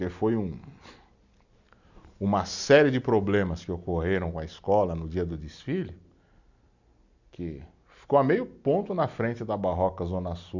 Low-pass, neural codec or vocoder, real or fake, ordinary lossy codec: 7.2 kHz; none; real; none